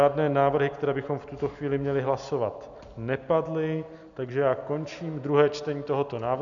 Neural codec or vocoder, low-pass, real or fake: none; 7.2 kHz; real